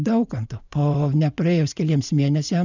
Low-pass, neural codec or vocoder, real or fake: 7.2 kHz; vocoder, 44.1 kHz, 80 mel bands, Vocos; fake